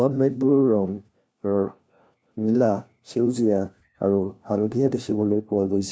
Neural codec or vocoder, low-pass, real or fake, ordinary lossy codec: codec, 16 kHz, 1 kbps, FunCodec, trained on LibriTTS, 50 frames a second; none; fake; none